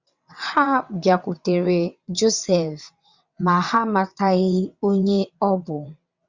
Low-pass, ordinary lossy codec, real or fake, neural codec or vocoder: 7.2 kHz; Opus, 64 kbps; fake; vocoder, 22.05 kHz, 80 mel bands, WaveNeXt